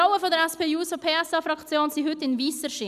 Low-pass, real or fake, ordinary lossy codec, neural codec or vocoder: 14.4 kHz; real; none; none